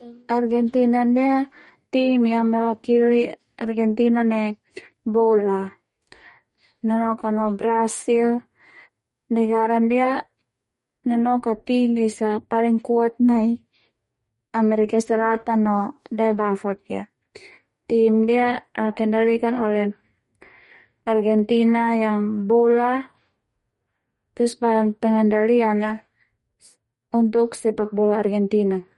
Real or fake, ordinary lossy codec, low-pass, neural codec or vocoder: fake; MP3, 48 kbps; 19.8 kHz; codec, 44.1 kHz, 2.6 kbps, DAC